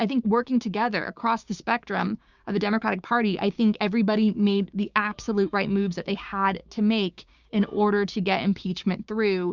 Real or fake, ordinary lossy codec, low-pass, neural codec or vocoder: fake; Opus, 64 kbps; 7.2 kHz; codec, 16 kHz, 6 kbps, DAC